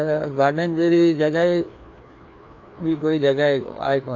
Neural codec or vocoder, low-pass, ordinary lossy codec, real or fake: codec, 16 kHz, 2 kbps, FreqCodec, larger model; 7.2 kHz; AAC, 48 kbps; fake